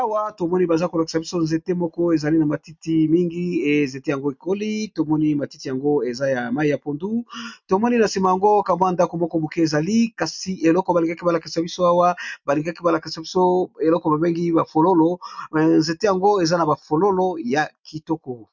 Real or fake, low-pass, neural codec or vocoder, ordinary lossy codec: real; 7.2 kHz; none; MP3, 64 kbps